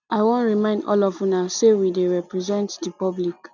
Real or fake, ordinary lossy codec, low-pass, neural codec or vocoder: real; none; 7.2 kHz; none